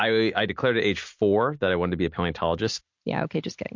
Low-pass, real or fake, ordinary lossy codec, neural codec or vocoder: 7.2 kHz; real; MP3, 48 kbps; none